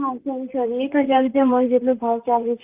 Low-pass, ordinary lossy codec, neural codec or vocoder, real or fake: 3.6 kHz; Opus, 32 kbps; vocoder, 44.1 kHz, 128 mel bands, Pupu-Vocoder; fake